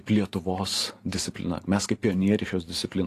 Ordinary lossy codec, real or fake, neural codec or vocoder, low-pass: AAC, 48 kbps; fake; vocoder, 44.1 kHz, 128 mel bands every 512 samples, BigVGAN v2; 14.4 kHz